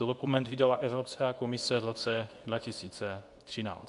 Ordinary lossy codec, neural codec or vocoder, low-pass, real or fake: AAC, 64 kbps; codec, 24 kHz, 0.9 kbps, WavTokenizer, medium speech release version 2; 10.8 kHz; fake